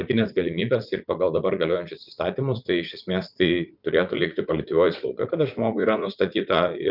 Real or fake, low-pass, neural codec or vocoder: fake; 5.4 kHz; vocoder, 44.1 kHz, 80 mel bands, Vocos